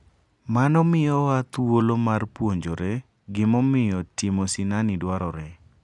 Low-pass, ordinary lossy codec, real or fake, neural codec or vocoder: 10.8 kHz; none; real; none